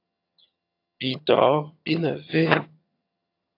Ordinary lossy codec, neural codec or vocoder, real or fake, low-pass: AAC, 32 kbps; vocoder, 22.05 kHz, 80 mel bands, HiFi-GAN; fake; 5.4 kHz